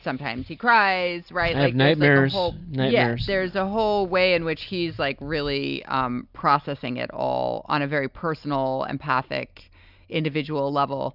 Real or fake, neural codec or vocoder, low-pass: real; none; 5.4 kHz